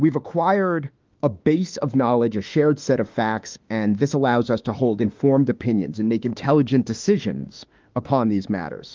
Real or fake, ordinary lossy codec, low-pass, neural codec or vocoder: fake; Opus, 32 kbps; 7.2 kHz; autoencoder, 48 kHz, 32 numbers a frame, DAC-VAE, trained on Japanese speech